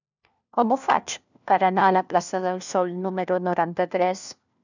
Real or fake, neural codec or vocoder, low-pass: fake; codec, 16 kHz, 1 kbps, FunCodec, trained on LibriTTS, 50 frames a second; 7.2 kHz